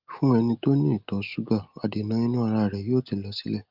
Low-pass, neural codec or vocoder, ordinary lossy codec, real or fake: 5.4 kHz; none; Opus, 24 kbps; real